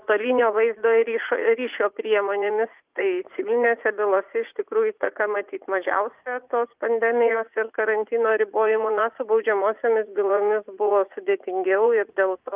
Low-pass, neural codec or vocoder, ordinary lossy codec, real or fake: 3.6 kHz; vocoder, 44.1 kHz, 80 mel bands, Vocos; Opus, 24 kbps; fake